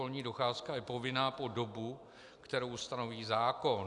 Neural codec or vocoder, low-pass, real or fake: none; 10.8 kHz; real